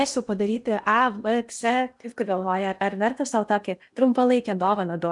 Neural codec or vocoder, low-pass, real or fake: codec, 16 kHz in and 24 kHz out, 0.8 kbps, FocalCodec, streaming, 65536 codes; 10.8 kHz; fake